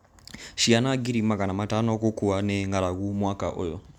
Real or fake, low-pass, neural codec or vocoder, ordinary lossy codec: real; 19.8 kHz; none; none